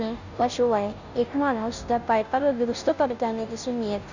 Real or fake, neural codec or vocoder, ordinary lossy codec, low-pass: fake; codec, 16 kHz, 0.5 kbps, FunCodec, trained on Chinese and English, 25 frames a second; none; 7.2 kHz